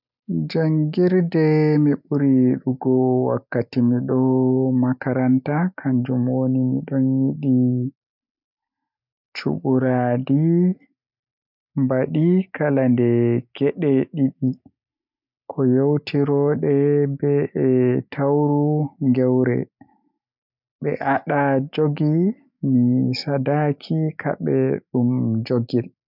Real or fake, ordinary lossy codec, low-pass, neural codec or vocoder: real; none; 5.4 kHz; none